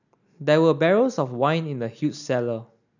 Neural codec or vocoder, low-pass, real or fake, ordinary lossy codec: none; 7.2 kHz; real; none